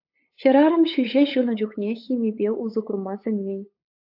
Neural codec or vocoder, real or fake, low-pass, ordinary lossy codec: codec, 16 kHz, 8 kbps, FunCodec, trained on LibriTTS, 25 frames a second; fake; 5.4 kHz; AAC, 48 kbps